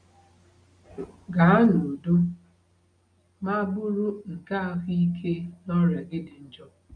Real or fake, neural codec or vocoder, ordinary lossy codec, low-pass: real; none; none; 9.9 kHz